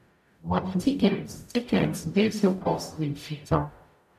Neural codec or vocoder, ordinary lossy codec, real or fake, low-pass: codec, 44.1 kHz, 0.9 kbps, DAC; MP3, 96 kbps; fake; 14.4 kHz